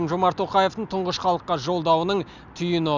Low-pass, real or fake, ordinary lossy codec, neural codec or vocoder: 7.2 kHz; real; none; none